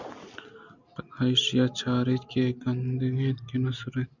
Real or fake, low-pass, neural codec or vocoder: real; 7.2 kHz; none